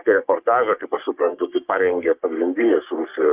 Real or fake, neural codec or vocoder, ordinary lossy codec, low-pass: fake; codec, 44.1 kHz, 3.4 kbps, Pupu-Codec; Opus, 32 kbps; 3.6 kHz